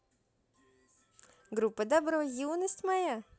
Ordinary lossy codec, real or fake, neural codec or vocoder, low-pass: none; real; none; none